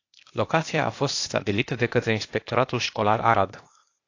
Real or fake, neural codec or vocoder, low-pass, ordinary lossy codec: fake; codec, 16 kHz, 0.8 kbps, ZipCodec; 7.2 kHz; AAC, 48 kbps